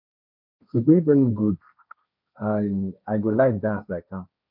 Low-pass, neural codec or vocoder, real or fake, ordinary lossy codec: 5.4 kHz; codec, 16 kHz, 1.1 kbps, Voila-Tokenizer; fake; none